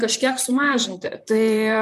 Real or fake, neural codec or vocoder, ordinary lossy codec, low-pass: fake; vocoder, 44.1 kHz, 128 mel bands, Pupu-Vocoder; MP3, 96 kbps; 14.4 kHz